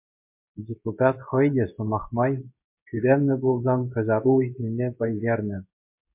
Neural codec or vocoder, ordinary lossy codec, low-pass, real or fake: codec, 24 kHz, 0.9 kbps, WavTokenizer, medium speech release version 2; MP3, 32 kbps; 3.6 kHz; fake